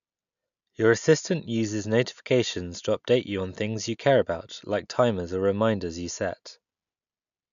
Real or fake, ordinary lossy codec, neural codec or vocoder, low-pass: real; none; none; 7.2 kHz